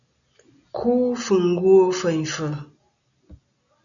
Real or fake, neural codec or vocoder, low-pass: real; none; 7.2 kHz